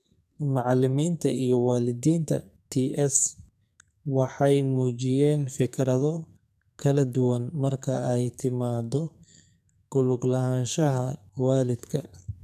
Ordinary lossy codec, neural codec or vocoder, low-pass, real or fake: none; codec, 44.1 kHz, 2.6 kbps, SNAC; 14.4 kHz; fake